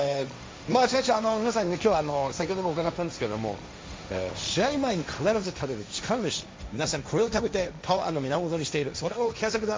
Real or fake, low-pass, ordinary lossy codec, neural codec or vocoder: fake; none; none; codec, 16 kHz, 1.1 kbps, Voila-Tokenizer